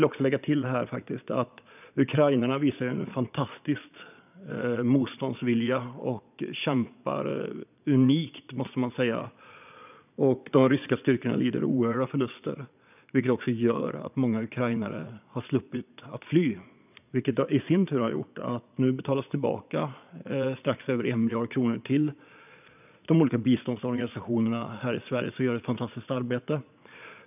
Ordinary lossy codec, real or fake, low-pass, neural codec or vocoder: none; fake; 3.6 kHz; vocoder, 22.05 kHz, 80 mel bands, Vocos